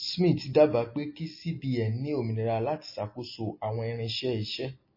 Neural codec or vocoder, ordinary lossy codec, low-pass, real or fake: none; MP3, 24 kbps; 5.4 kHz; real